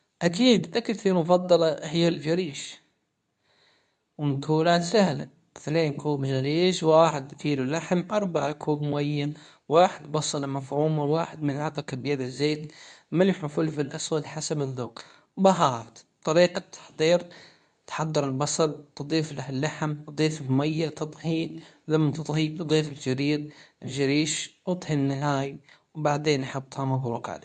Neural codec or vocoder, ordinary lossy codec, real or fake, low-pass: codec, 24 kHz, 0.9 kbps, WavTokenizer, medium speech release version 2; none; fake; 10.8 kHz